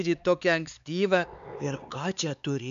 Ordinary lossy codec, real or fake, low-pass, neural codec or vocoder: MP3, 64 kbps; fake; 7.2 kHz; codec, 16 kHz, 4 kbps, X-Codec, HuBERT features, trained on LibriSpeech